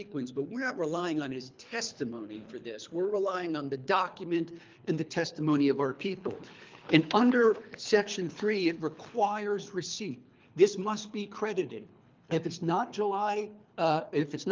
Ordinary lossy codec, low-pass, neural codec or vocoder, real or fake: Opus, 24 kbps; 7.2 kHz; codec, 24 kHz, 3 kbps, HILCodec; fake